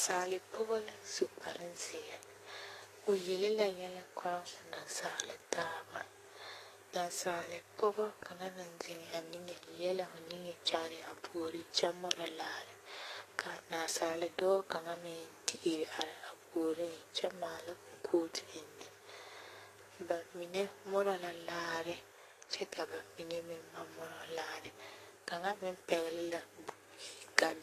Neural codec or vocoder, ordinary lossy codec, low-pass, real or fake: codec, 32 kHz, 1.9 kbps, SNAC; AAC, 48 kbps; 14.4 kHz; fake